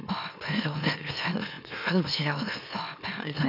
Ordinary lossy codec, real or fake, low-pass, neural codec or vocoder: none; fake; 5.4 kHz; autoencoder, 44.1 kHz, a latent of 192 numbers a frame, MeloTTS